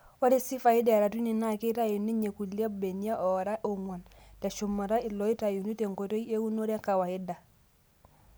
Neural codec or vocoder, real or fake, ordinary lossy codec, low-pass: none; real; none; none